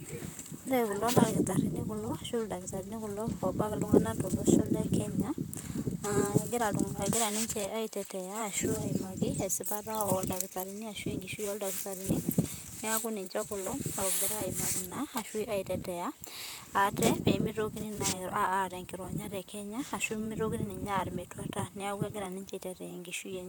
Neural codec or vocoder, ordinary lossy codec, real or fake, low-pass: vocoder, 44.1 kHz, 128 mel bands, Pupu-Vocoder; none; fake; none